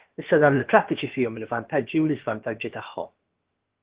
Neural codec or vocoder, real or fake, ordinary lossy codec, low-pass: codec, 16 kHz, about 1 kbps, DyCAST, with the encoder's durations; fake; Opus, 16 kbps; 3.6 kHz